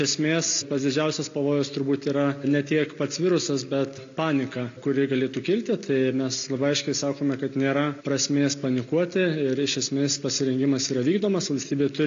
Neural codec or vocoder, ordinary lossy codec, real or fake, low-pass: none; AAC, 64 kbps; real; 7.2 kHz